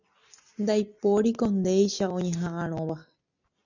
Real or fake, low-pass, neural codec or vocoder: real; 7.2 kHz; none